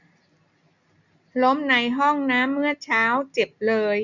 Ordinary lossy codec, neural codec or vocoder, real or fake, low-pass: none; none; real; 7.2 kHz